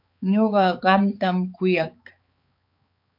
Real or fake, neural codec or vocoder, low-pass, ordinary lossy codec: fake; codec, 16 kHz, 4 kbps, X-Codec, HuBERT features, trained on balanced general audio; 5.4 kHz; MP3, 48 kbps